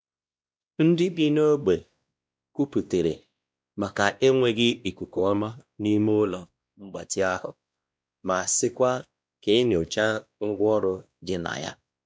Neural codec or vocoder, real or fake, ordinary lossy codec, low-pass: codec, 16 kHz, 1 kbps, X-Codec, WavLM features, trained on Multilingual LibriSpeech; fake; none; none